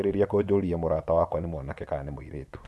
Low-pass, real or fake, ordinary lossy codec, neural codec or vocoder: none; fake; none; vocoder, 24 kHz, 100 mel bands, Vocos